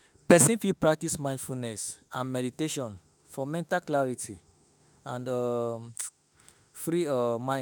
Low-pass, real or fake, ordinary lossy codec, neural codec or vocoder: none; fake; none; autoencoder, 48 kHz, 32 numbers a frame, DAC-VAE, trained on Japanese speech